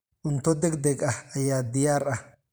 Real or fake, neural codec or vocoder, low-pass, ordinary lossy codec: fake; vocoder, 44.1 kHz, 128 mel bands every 512 samples, BigVGAN v2; none; none